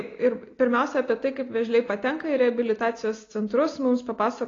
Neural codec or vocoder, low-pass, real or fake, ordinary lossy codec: none; 7.2 kHz; real; AAC, 32 kbps